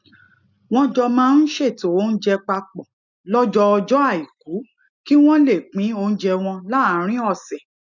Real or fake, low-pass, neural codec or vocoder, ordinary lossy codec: real; 7.2 kHz; none; none